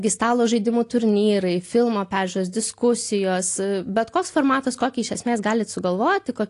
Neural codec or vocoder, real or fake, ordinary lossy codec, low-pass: none; real; AAC, 48 kbps; 10.8 kHz